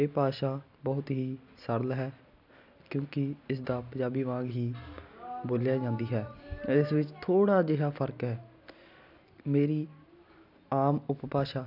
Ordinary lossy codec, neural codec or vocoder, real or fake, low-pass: none; none; real; 5.4 kHz